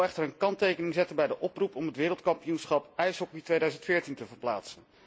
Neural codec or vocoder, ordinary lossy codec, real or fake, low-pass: none; none; real; none